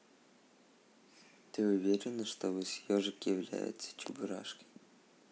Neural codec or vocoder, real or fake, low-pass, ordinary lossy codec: none; real; none; none